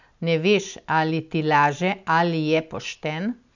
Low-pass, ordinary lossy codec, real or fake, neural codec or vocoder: 7.2 kHz; none; real; none